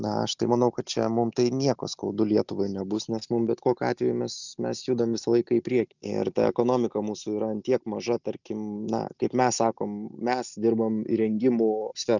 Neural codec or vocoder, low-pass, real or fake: none; 7.2 kHz; real